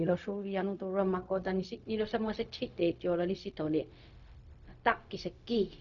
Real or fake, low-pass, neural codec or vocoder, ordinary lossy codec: fake; 7.2 kHz; codec, 16 kHz, 0.4 kbps, LongCat-Audio-Codec; none